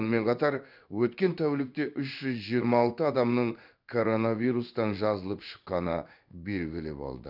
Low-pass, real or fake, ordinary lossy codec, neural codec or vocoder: 5.4 kHz; fake; none; codec, 16 kHz in and 24 kHz out, 1 kbps, XY-Tokenizer